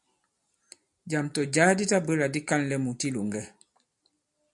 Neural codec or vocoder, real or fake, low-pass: none; real; 10.8 kHz